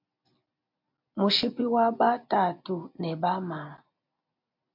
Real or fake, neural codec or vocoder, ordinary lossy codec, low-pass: real; none; MP3, 48 kbps; 5.4 kHz